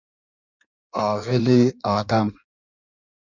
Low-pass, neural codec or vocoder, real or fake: 7.2 kHz; codec, 16 kHz in and 24 kHz out, 1.1 kbps, FireRedTTS-2 codec; fake